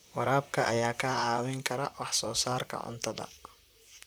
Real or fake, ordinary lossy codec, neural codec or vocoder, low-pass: fake; none; vocoder, 44.1 kHz, 128 mel bands, Pupu-Vocoder; none